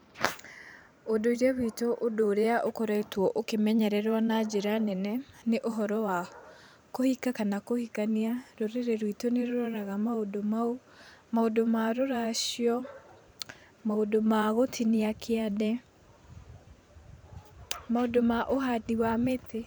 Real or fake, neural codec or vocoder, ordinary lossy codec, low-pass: fake; vocoder, 44.1 kHz, 128 mel bands every 512 samples, BigVGAN v2; none; none